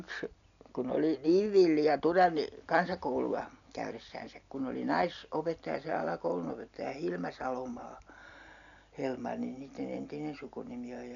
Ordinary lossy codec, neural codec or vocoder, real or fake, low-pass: none; none; real; 7.2 kHz